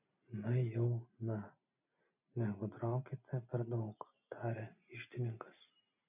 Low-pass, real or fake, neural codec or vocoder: 3.6 kHz; real; none